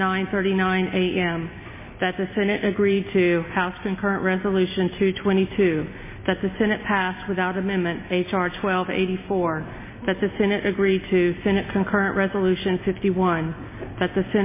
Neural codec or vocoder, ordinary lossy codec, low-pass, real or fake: none; MP3, 16 kbps; 3.6 kHz; real